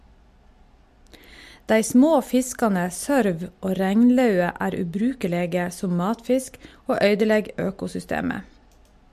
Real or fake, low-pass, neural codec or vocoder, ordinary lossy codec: real; 14.4 kHz; none; MP3, 64 kbps